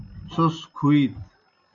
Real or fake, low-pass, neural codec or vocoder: real; 7.2 kHz; none